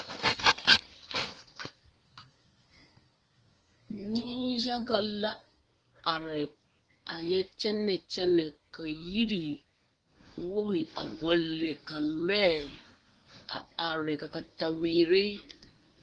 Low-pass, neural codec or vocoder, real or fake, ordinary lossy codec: 9.9 kHz; codec, 24 kHz, 1 kbps, SNAC; fake; Opus, 24 kbps